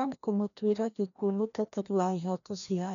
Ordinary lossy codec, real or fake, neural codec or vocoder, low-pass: none; fake; codec, 16 kHz, 1 kbps, FreqCodec, larger model; 7.2 kHz